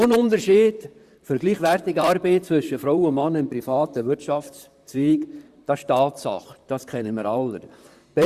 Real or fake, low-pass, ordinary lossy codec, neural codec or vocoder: fake; 14.4 kHz; Opus, 64 kbps; vocoder, 44.1 kHz, 128 mel bands, Pupu-Vocoder